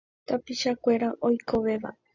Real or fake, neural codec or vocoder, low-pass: real; none; 7.2 kHz